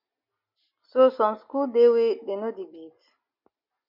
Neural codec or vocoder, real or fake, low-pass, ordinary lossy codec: none; real; 5.4 kHz; MP3, 48 kbps